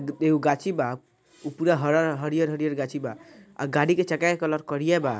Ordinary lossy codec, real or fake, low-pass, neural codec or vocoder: none; real; none; none